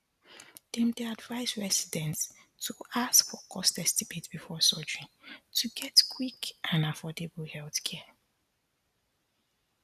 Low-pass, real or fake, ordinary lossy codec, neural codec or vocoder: 14.4 kHz; real; none; none